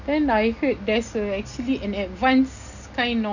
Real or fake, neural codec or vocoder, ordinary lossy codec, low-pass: real; none; none; 7.2 kHz